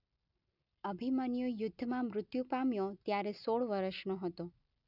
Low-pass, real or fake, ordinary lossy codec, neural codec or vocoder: 5.4 kHz; real; none; none